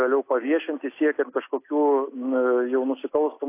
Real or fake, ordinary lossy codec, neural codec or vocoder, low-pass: real; AAC, 24 kbps; none; 3.6 kHz